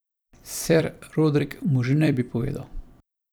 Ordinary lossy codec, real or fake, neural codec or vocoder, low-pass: none; fake; vocoder, 44.1 kHz, 128 mel bands every 256 samples, BigVGAN v2; none